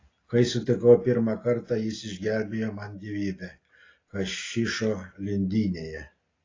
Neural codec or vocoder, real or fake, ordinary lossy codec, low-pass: none; real; AAC, 32 kbps; 7.2 kHz